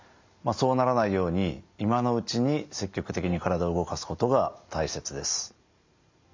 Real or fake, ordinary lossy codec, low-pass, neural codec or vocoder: real; MP3, 64 kbps; 7.2 kHz; none